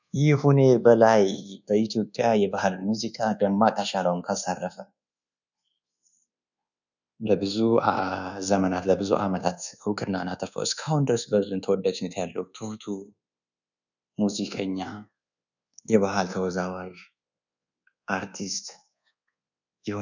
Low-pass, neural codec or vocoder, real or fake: 7.2 kHz; codec, 24 kHz, 1.2 kbps, DualCodec; fake